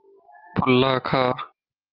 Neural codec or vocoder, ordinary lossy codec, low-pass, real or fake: none; Opus, 24 kbps; 5.4 kHz; real